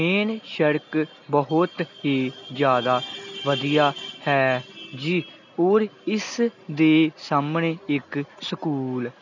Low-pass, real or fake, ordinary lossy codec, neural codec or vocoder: 7.2 kHz; real; none; none